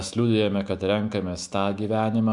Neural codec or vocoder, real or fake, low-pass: autoencoder, 48 kHz, 128 numbers a frame, DAC-VAE, trained on Japanese speech; fake; 10.8 kHz